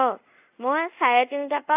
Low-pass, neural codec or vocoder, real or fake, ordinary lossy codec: 3.6 kHz; codec, 24 kHz, 0.5 kbps, DualCodec; fake; AAC, 32 kbps